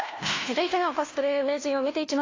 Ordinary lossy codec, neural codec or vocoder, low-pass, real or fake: AAC, 32 kbps; codec, 16 kHz, 1 kbps, FunCodec, trained on LibriTTS, 50 frames a second; 7.2 kHz; fake